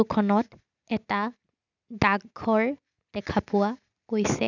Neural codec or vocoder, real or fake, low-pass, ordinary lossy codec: none; real; 7.2 kHz; none